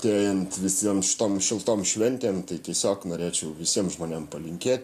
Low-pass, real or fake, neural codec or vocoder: 14.4 kHz; real; none